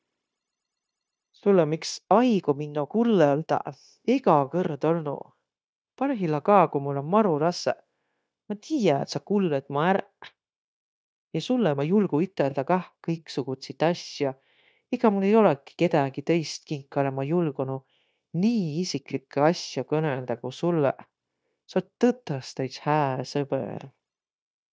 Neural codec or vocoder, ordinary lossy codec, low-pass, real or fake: codec, 16 kHz, 0.9 kbps, LongCat-Audio-Codec; none; none; fake